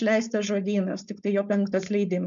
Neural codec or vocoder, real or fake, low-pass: codec, 16 kHz, 4.8 kbps, FACodec; fake; 7.2 kHz